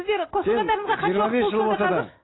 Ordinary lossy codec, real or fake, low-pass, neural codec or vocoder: AAC, 16 kbps; real; 7.2 kHz; none